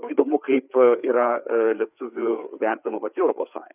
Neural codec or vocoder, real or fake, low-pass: codec, 16 kHz, 4 kbps, FreqCodec, larger model; fake; 3.6 kHz